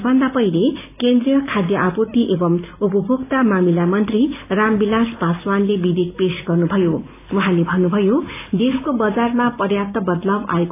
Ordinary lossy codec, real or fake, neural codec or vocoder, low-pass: AAC, 24 kbps; real; none; 3.6 kHz